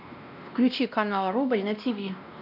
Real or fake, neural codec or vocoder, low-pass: fake; codec, 16 kHz, 1 kbps, X-Codec, WavLM features, trained on Multilingual LibriSpeech; 5.4 kHz